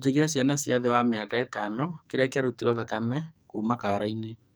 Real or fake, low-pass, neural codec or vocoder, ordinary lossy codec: fake; none; codec, 44.1 kHz, 2.6 kbps, SNAC; none